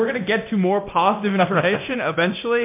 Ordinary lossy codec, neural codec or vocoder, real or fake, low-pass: MP3, 32 kbps; codec, 24 kHz, 0.9 kbps, DualCodec; fake; 3.6 kHz